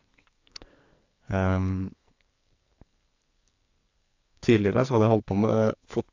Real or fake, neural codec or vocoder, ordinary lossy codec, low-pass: fake; codec, 44.1 kHz, 2.6 kbps, SNAC; AAC, 48 kbps; 7.2 kHz